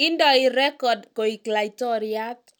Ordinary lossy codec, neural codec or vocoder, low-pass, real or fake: none; none; 19.8 kHz; real